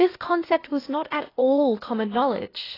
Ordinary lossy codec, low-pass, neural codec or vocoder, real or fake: AAC, 24 kbps; 5.4 kHz; codec, 16 kHz, 0.8 kbps, ZipCodec; fake